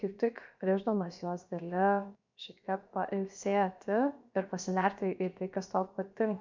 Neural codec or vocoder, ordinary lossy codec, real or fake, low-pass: codec, 16 kHz, 0.7 kbps, FocalCodec; MP3, 64 kbps; fake; 7.2 kHz